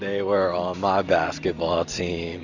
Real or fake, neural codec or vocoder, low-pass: real; none; 7.2 kHz